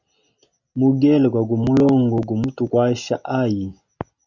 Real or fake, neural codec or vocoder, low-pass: real; none; 7.2 kHz